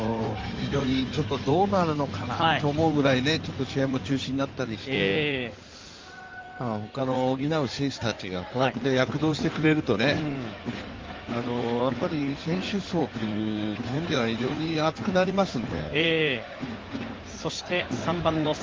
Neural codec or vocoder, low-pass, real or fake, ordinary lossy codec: codec, 16 kHz in and 24 kHz out, 2.2 kbps, FireRedTTS-2 codec; 7.2 kHz; fake; Opus, 32 kbps